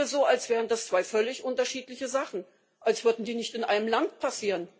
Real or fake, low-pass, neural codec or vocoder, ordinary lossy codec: real; none; none; none